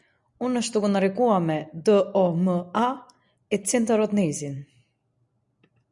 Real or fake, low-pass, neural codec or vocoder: real; 10.8 kHz; none